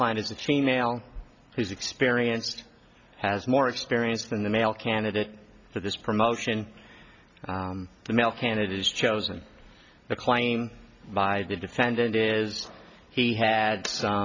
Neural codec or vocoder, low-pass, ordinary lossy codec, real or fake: none; 7.2 kHz; MP3, 64 kbps; real